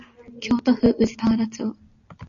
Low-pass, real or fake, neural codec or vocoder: 7.2 kHz; real; none